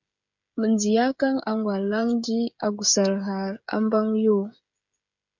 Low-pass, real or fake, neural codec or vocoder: 7.2 kHz; fake; codec, 16 kHz, 16 kbps, FreqCodec, smaller model